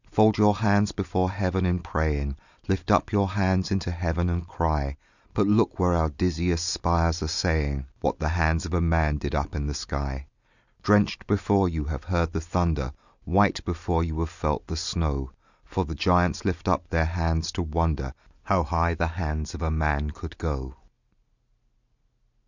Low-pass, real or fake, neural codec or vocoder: 7.2 kHz; real; none